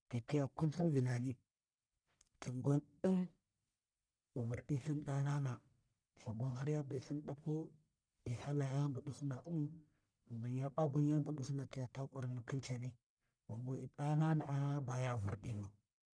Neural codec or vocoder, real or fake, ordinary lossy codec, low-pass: codec, 44.1 kHz, 1.7 kbps, Pupu-Codec; fake; none; 9.9 kHz